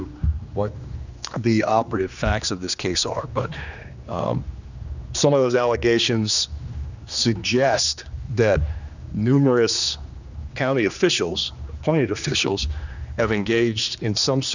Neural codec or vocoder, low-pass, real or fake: codec, 16 kHz, 2 kbps, X-Codec, HuBERT features, trained on general audio; 7.2 kHz; fake